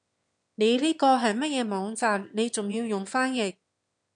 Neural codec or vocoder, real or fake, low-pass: autoencoder, 22.05 kHz, a latent of 192 numbers a frame, VITS, trained on one speaker; fake; 9.9 kHz